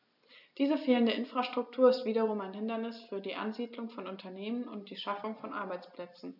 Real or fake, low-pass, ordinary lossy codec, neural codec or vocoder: real; 5.4 kHz; none; none